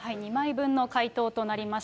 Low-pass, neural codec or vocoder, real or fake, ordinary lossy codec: none; none; real; none